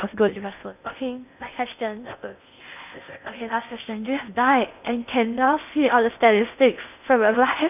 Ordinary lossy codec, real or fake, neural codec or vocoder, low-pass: none; fake; codec, 16 kHz in and 24 kHz out, 0.6 kbps, FocalCodec, streaming, 2048 codes; 3.6 kHz